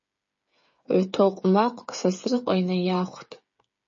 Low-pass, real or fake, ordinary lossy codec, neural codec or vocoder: 7.2 kHz; fake; MP3, 32 kbps; codec, 16 kHz, 8 kbps, FreqCodec, smaller model